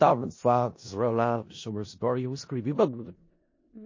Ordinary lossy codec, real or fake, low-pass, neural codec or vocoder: MP3, 32 kbps; fake; 7.2 kHz; codec, 16 kHz in and 24 kHz out, 0.4 kbps, LongCat-Audio-Codec, four codebook decoder